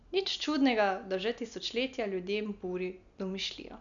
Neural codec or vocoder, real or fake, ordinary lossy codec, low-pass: none; real; none; 7.2 kHz